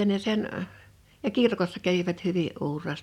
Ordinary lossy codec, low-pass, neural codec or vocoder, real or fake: none; 19.8 kHz; none; real